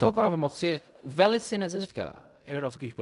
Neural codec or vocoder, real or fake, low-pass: codec, 16 kHz in and 24 kHz out, 0.4 kbps, LongCat-Audio-Codec, fine tuned four codebook decoder; fake; 10.8 kHz